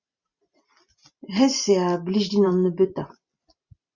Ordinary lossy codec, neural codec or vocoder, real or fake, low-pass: Opus, 64 kbps; vocoder, 44.1 kHz, 128 mel bands every 256 samples, BigVGAN v2; fake; 7.2 kHz